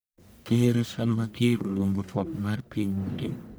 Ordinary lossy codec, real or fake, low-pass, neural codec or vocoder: none; fake; none; codec, 44.1 kHz, 1.7 kbps, Pupu-Codec